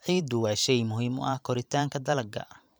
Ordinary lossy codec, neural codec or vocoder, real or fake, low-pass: none; vocoder, 44.1 kHz, 128 mel bands every 512 samples, BigVGAN v2; fake; none